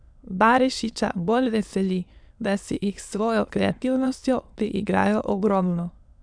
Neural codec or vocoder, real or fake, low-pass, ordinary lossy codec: autoencoder, 22.05 kHz, a latent of 192 numbers a frame, VITS, trained on many speakers; fake; 9.9 kHz; none